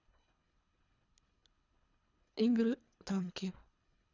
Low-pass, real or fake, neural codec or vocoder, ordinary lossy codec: 7.2 kHz; fake; codec, 24 kHz, 3 kbps, HILCodec; none